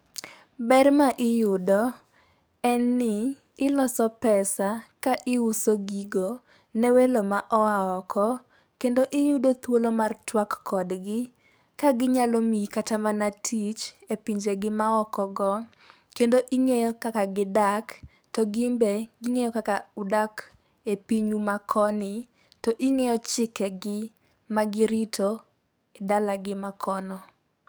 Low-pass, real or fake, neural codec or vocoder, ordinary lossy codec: none; fake; codec, 44.1 kHz, 7.8 kbps, DAC; none